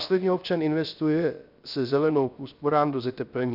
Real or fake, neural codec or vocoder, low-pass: fake; codec, 16 kHz, 0.3 kbps, FocalCodec; 5.4 kHz